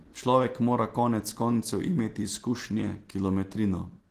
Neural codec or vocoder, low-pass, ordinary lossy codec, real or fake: none; 14.4 kHz; Opus, 16 kbps; real